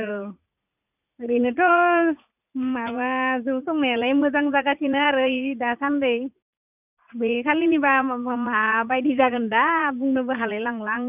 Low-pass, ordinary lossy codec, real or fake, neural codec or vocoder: 3.6 kHz; none; fake; vocoder, 44.1 kHz, 80 mel bands, Vocos